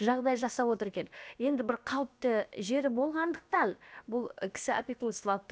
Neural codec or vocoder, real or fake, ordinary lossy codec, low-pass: codec, 16 kHz, about 1 kbps, DyCAST, with the encoder's durations; fake; none; none